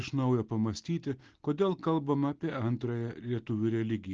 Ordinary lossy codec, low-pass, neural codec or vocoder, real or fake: Opus, 16 kbps; 7.2 kHz; none; real